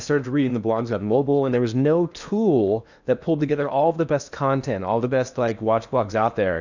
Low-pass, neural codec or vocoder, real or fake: 7.2 kHz; codec, 16 kHz in and 24 kHz out, 0.8 kbps, FocalCodec, streaming, 65536 codes; fake